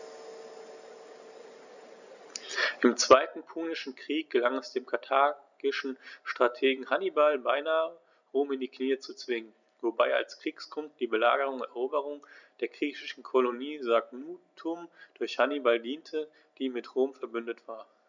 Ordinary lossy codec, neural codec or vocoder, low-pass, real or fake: none; none; 7.2 kHz; real